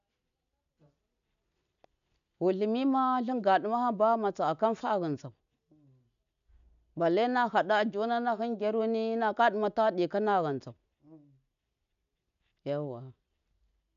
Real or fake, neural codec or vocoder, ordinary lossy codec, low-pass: real; none; none; 7.2 kHz